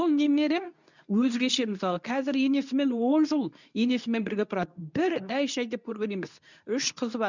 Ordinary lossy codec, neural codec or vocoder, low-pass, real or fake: none; codec, 24 kHz, 0.9 kbps, WavTokenizer, medium speech release version 2; 7.2 kHz; fake